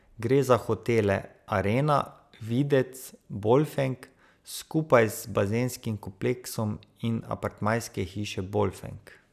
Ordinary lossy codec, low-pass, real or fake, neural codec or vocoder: none; 14.4 kHz; real; none